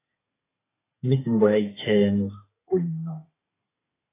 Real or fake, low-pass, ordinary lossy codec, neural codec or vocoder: fake; 3.6 kHz; AAC, 16 kbps; codec, 32 kHz, 1.9 kbps, SNAC